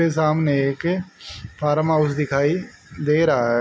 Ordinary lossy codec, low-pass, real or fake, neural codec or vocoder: none; none; real; none